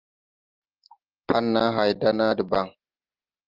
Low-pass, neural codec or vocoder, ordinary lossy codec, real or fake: 5.4 kHz; none; Opus, 32 kbps; real